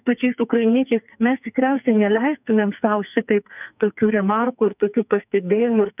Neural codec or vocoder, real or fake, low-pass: codec, 44.1 kHz, 2.6 kbps, SNAC; fake; 3.6 kHz